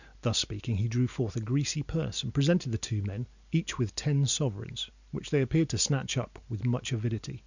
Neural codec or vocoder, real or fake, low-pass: none; real; 7.2 kHz